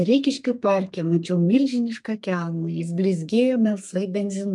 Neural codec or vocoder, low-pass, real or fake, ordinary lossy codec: codec, 32 kHz, 1.9 kbps, SNAC; 10.8 kHz; fake; MP3, 64 kbps